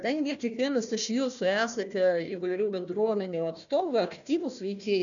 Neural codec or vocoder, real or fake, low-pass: codec, 16 kHz, 1 kbps, FunCodec, trained on Chinese and English, 50 frames a second; fake; 7.2 kHz